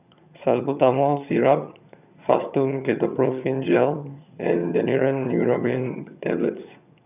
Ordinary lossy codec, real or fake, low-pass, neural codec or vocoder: none; fake; 3.6 kHz; vocoder, 22.05 kHz, 80 mel bands, HiFi-GAN